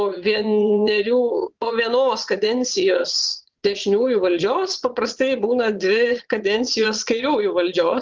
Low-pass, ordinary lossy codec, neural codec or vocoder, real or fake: 7.2 kHz; Opus, 24 kbps; vocoder, 22.05 kHz, 80 mel bands, Vocos; fake